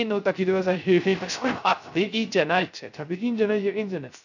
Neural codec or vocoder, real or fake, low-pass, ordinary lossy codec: codec, 16 kHz, 0.3 kbps, FocalCodec; fake; 7.2 kHz; none